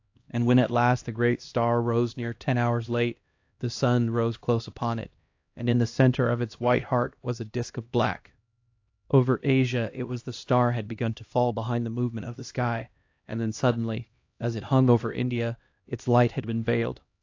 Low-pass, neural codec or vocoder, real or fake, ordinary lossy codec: 7.2 kHz; codec, 16 kHz, 1 kbps, X-Codec, HuBERT features, trained on LibriSpeech; fake; AAC, 48 kbps